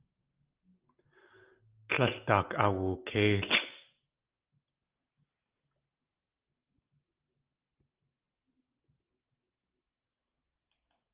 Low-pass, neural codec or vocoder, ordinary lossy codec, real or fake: 3.6 kHz; none; Opus, 32 kbps; real